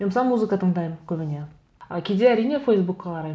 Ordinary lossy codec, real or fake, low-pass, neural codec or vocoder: none; real; none; none